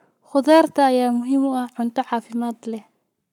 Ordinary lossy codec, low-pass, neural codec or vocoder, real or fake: none; 19.8 kHz; codec, 44.1 kHz, 7.8 kbps, Pupu-Codec; fake